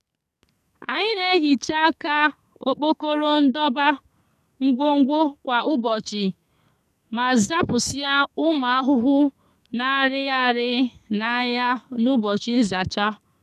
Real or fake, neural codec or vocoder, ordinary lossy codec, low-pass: fake; codec, 44.1 kHz, 2.6 kbps, SNAC; none; 14.4 kHz